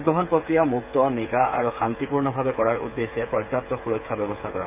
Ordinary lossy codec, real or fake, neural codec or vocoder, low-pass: none; fake; codec, 16 kHz, 8 kbps, FreqCodec, smaller model; 3.6 kHz